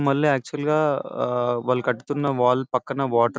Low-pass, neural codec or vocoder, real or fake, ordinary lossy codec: none; none; real; none